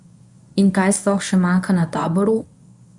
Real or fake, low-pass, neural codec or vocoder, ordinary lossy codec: fake; 10.8 kHz; codec, 24 kHz, 0.9 kbps, WavTokenizer, medium speech release version 1; none